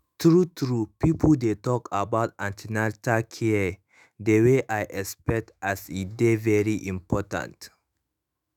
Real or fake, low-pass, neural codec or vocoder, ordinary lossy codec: fake; 19.8 kHz; autoencoder, 48 kHz, 128 numbers a frame, DAC-VAE, trained on Japanese speech; none